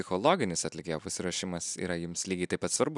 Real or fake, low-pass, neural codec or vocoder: real; 10.8 kHz; none